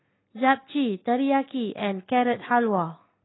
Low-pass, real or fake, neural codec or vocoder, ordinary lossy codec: 7.2 kHz; real; none; AAC, 16 kbps